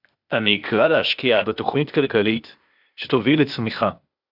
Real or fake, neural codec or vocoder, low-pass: fake; codec, 16 kHz, 0.8 kbps, ZipCodec; 5.4 kHz